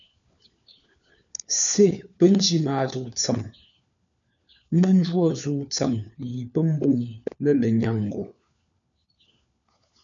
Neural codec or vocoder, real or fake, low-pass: codec, 16 kHz, 4 kbps, FunCodec, trained on LibriTTS, 50 frames a second; fake; 7.2 kHz